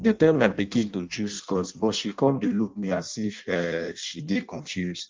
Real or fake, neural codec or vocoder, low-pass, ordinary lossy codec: fake; codec, 16 kHz in and 24 kHz out, 0.6 kbps, FireRedTTS-2 codec; 7.2 kHz; Opus, 32 kbps